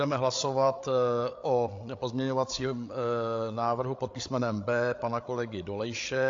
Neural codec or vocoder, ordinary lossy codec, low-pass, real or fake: codec, 16 kHz, 8 kbps, FreqCodec, larger model; AAC, 48 kbps; 7.2 kHz; fake